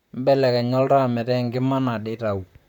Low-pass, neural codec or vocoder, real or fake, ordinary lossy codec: 19.8 kHz; vocoder, 44.1 kHz, 128 mel bands, Pupu-Vocoder; fake; none